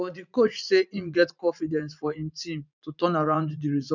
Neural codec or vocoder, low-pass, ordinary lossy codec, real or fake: vocoder, 44.1 kHz, 80 mel bands, Vocos; 7.2 kHz; none; fake